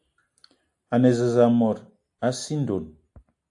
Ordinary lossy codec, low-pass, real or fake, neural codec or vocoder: AAC, 64 kbps; 10.8 kHz; real; none